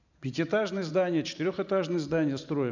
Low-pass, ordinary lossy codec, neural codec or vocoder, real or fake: 7.2 kHz; none; vocoder, 44.1 kHz, 128 mel bands every 512 samples, BigVGAN v2; fake